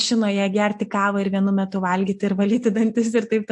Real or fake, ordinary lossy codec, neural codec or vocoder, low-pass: real; MP3, 48 kbps; none; 10.8 kHz